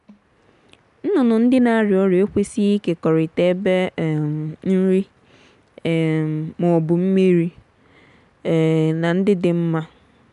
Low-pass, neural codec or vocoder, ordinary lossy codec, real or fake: 10.8 kHz; none; none; real